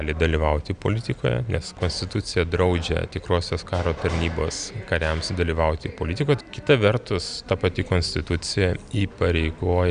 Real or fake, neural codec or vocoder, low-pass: real; none; 9.9 kHz